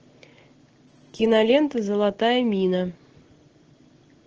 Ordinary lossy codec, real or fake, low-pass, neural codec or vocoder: Opus, 16 kbps; real; 7.2 kHz; none